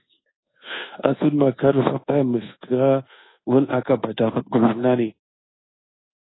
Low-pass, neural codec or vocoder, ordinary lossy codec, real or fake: 7.2 kHz; codec, 24 kHz, 1.2 kbps, DualCodec; AAC, 16 kbps; fake